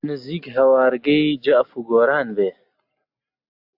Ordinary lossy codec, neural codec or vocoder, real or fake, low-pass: AAC, 48 kbps; none; real; 5.4 kHz